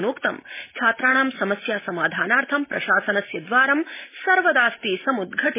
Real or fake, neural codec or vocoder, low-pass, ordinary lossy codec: real; none; 3.6 kHz; MP3, 16 kbps